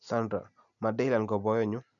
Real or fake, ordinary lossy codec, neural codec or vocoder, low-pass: real; none; none; 7.2 kHz